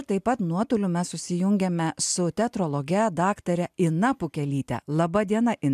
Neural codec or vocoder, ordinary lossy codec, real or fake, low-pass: none; MP3, 96 kbps; real; 14.4 kHz